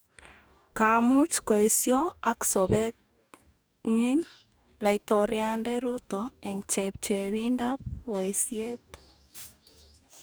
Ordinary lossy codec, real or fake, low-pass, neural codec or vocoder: none; fake; none; codec, 44.1 kHz, 2.6 kbps, DAC